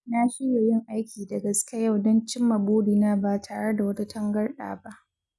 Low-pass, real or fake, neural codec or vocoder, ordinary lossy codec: none; real; none; none